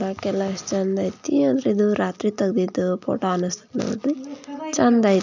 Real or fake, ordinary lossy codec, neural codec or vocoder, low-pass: real; none; none; 7.2 kHz